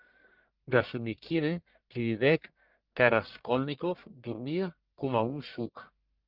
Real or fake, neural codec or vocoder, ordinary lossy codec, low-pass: fake; codec, 44.1 kHz, 1.7 kbps, Pupu-Codec; Opus, 32 kbps; 5.4 kHz